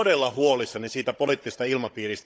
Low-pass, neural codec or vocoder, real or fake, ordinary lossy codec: none; codec, 16 kHz, 16 kbps, FunCodec, trained on Chinese and English, 50 frames a second; fake; none